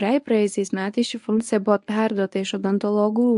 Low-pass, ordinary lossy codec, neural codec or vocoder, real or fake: 10.8 kHz; AAC, 64 kbps; codec, 24 kHz, 0.9 kbps, WavTokenizer, medium speech release version 1; fake